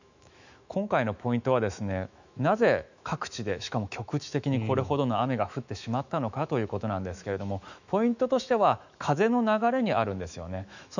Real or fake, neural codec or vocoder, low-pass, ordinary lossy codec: fake; autoencoder, 48 kHz, 128 numbers a frame, DAC-VAE, trained on Japanese speech; 7.2 kHz; none